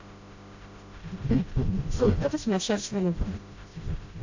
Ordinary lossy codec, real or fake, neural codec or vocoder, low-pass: AAC, 48 kbps; fake; codec, 16 kHz, 0.5 kbps, FreqCodec, smaller model; 7.2 kHz